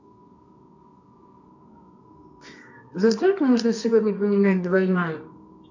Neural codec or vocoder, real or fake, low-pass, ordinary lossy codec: codec, 24 kHz, 0.9 kbps, WavTokenizer, medium music audio release; fake; 7.2 kHz; AAC, 48 kbps